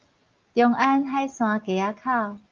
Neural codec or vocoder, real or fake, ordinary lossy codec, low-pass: none; real; Opus, 24 kbps; 7.2 kHz